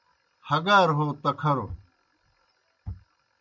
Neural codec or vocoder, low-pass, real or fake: none; 7.2 kHz; real